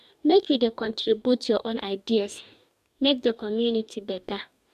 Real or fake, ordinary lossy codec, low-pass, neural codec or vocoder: fake; none; 14.4 kHz; codec, 44.1 kHz, 2.6 kbps, DAC